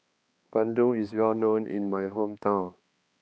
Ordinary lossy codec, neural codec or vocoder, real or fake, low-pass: none; codec, 16 kHz, 2 kbps, X-Codec, HuBERT features, trained on balanced general audio; fake; none